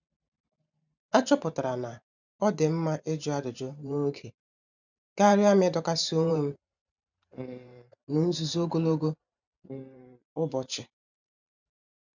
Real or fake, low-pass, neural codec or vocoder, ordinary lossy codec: fake; 7.2 kHz; vocoder, 44.1 kHz, 128 mel bands every 512 samples, BigVGAN v2; none